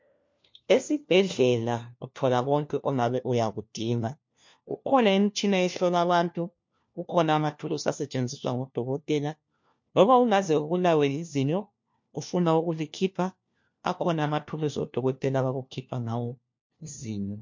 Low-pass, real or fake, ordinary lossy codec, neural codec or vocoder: 7.2 kHz; fake; MP3, 48 kbps; codec, 16 kHz, 1 kbps, FunCodec, trained on LibriTTS, 50 frames a second